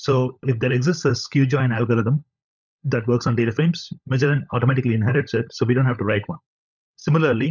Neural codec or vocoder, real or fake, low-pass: codec, 16 kHz, 16 kbps, FunCodec, trained on LibriTTS, 50 frames a second; fake; 7.2 kHz